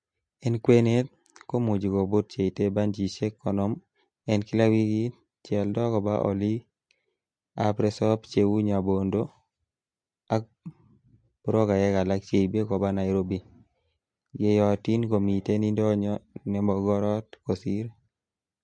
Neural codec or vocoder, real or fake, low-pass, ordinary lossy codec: none; real; 9.9 kHz; MP3, 48 kbps